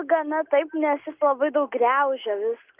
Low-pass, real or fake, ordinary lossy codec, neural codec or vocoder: 3.6 kHz; real; Opus, 24 kbps; none